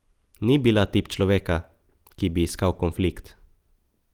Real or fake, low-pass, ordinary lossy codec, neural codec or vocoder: real; 19.8 kHz; Opus, 24 kbps; none